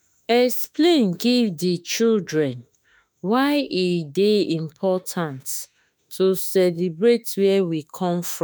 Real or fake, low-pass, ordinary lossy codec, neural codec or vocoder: fake; none; none; autoencoder, 48 kHz, 32 numbers a frame, DAC-VAE, trained on Japanese speech